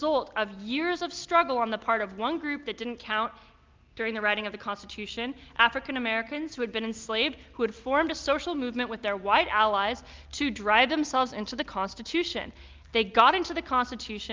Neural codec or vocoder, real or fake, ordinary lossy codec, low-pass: none; real; Opus, 32 kbps; 7.2 kHz